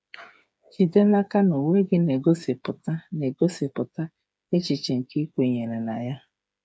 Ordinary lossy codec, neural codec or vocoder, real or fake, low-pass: none; codec, 16 kHz, 8 kbps, FreqCodec, smaller model; fake; none